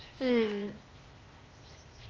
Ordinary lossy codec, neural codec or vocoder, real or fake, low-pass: Opus, 24 kbps; codec, 16 kHz, 0.8 kbps, ZipCodec; fake; 7.2 kHz